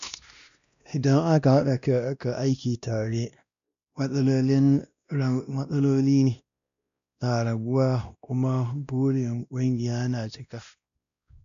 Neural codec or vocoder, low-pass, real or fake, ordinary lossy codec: codec, 16 kHz, 1 kbps, X-Codec, WavLM features, trained on Multilingual LibriSpeech; 7.2 kHz; fake; AAC, 96 kbps